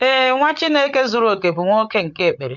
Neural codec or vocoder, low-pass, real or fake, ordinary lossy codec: codec, 16 kHz, 8 kbps, FreqCodec, larger model; 7.2 kHz; fake; none